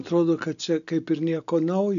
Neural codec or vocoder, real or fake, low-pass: none; real; 7.2 kHz